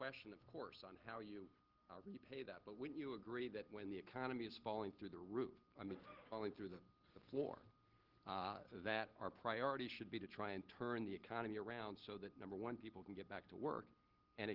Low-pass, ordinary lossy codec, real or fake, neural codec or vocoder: 5.4 kHz; Opus, 16 kbps; real; none